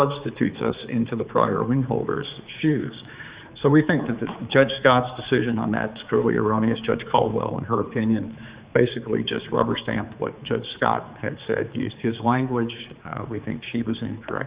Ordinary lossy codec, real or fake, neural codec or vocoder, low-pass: Opus, 64 kbps; fake; codec, 16 kHz, 4 kbps, X-Codec, HuBERT features, trained on general audio; 3.6 kHz